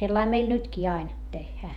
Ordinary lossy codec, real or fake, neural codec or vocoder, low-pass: none; fake; vocoder, 48 kHz, 128 mel bands, Vocos; 19.8 kHz